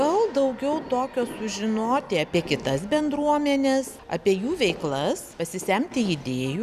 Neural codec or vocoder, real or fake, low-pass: none; real; 14.4 kHz